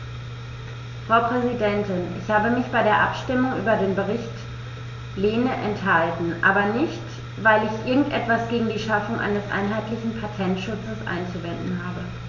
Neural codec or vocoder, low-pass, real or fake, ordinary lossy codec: none; 7.2 kHz; real; none